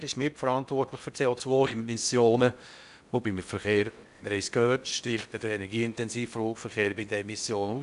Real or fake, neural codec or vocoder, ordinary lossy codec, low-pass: fake; codec, 16 kHz in and 24 kHz out, 0.8 kbps, FocalCodec, streaming, 65536 codes; none; 10.8 kHz